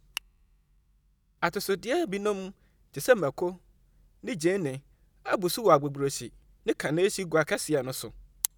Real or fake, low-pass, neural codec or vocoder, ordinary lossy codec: real; none; none; none